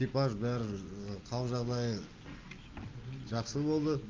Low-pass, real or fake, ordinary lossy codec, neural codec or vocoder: 7.2 kHz; real; Opus, 24 kbps; none